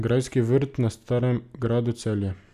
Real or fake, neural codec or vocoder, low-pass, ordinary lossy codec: real; none; 14.4 kHz; none